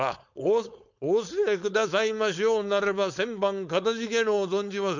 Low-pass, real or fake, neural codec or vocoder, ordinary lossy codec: 7.2 kHz; fake; codec, 16 kHz, 4.8 kbps, FACodec; none